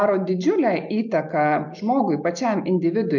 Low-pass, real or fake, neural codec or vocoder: 7.2 kHz; real; none